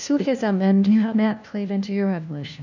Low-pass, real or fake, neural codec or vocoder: 7.2 kHz; fake; codec, 16 kHz, 1 kbps, FunCodec, trained on LibriTTS, 50 frames a second